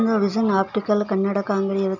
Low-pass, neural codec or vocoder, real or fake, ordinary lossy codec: 7.2 kHz; none; real; none